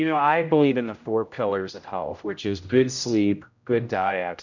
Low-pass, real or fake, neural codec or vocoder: 7.2 kHz; fake; codec, 16 kHz, 0.5 kbps, X-Codec, HuBERT features, trained on general audio